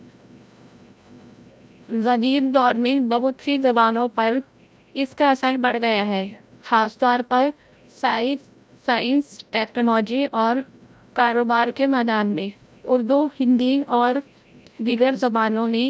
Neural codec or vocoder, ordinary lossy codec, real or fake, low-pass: codec, 16 kHz, 0.5 kbps, FreqCodec, larger model; none; fake; none